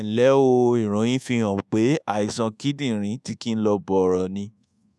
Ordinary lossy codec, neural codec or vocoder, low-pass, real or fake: none; codec, 24 kHz, 1.2 kbps, DualCodec; none; fake